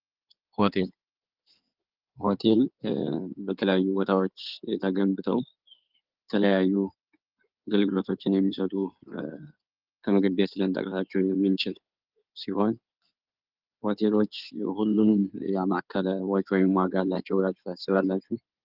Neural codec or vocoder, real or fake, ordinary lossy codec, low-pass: codec, 16 kHz in and 24 kHz out, 2.2 kbps, FireRedTTS-2 codec; fake; Opus, 32 kbps; 5.4 kHz